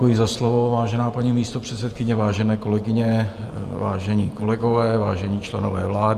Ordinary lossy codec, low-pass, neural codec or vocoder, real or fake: Opus, 32 kbps; 14.4 kHz; vocoder, 48 kHz, 128 mel bands, Vocos; fake